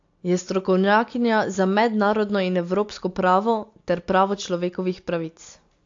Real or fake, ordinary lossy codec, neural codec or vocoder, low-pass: real; AAC, 48 kbps; none; 7.2 kHz